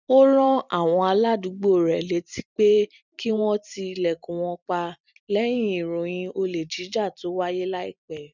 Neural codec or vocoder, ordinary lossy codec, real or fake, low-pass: vocoder, 44.1 kHz, 128 mel bands every 256 samples, BigVGAN v2; none; fake; 7.2 kHz